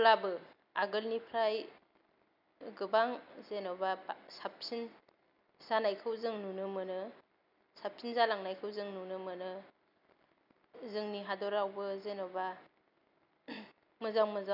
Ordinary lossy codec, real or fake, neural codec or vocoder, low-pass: none; real; none; 5.4 kHz